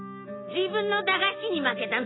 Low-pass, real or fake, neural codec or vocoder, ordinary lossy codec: 7.2 kHz; real; none; AAC, 16 kbps